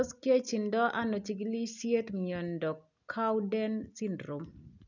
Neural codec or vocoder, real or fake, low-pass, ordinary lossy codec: none; real; 7.2 kHz; none